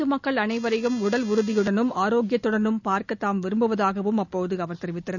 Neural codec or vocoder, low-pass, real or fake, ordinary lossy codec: none; 7.2 kHz; real; none